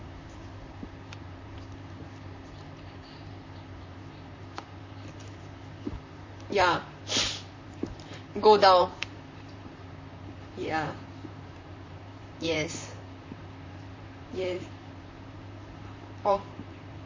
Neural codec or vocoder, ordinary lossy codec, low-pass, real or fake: vocoder, 44.1 kHz, 128 mel bands, Pupu-Vocoder; MP3, 32 kbps; 7.2 kHz; fake